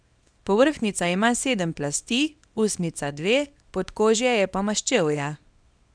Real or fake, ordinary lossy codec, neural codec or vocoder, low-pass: fake; none; codec, 24 kHz, 0.9 kbps, WavTokenizer, small release; 9.9 kHz